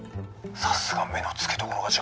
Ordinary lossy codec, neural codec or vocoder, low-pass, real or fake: none; none; none; real